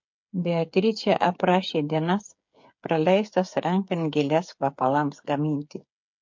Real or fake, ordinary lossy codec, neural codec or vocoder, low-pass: fake; MP3, 48 kbps; codec, 16 kHz, 8 kbps, FreqCodec, smaller model; 7.2 kHz